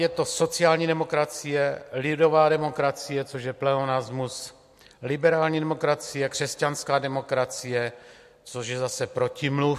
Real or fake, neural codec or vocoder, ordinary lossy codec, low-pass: real; none; MP3, 64 kbps; 14.4 kHz